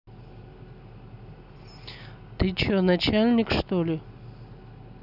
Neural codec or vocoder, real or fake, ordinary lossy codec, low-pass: none; real; none; 5.4 kHz